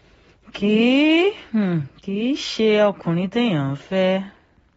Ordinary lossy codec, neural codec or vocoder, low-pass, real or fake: AAC, 24 kbps; none; 19.8 kHz; real